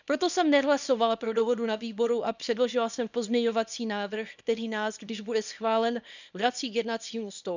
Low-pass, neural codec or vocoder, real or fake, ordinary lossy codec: 7.2 kHz; codec, 24 kHz, 0.9 kbps, WavTokenizer, small release; fake; none